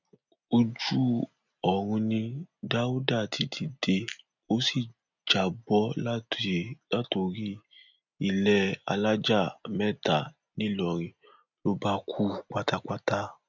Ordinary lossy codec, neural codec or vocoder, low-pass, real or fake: none; none; 7.2 kHz; real